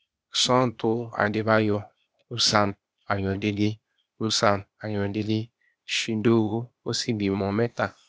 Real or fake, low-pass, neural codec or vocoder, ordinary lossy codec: fake; none; codec, 16 kHz, 0.8 kbps, ZipCodec; none